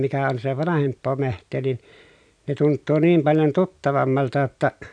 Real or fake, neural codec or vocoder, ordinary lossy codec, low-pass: real; none; none; 9.9 kHz